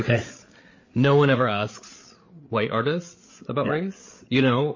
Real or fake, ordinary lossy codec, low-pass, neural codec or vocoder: fake; MP3, 32 kbps; 7.2 kHz; codec, 16 kHz, 8 kbps, FreqCodec, larger model